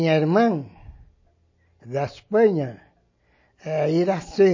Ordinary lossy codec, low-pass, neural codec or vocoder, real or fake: MP3, 32 kbps; 7.2 kHz; none; real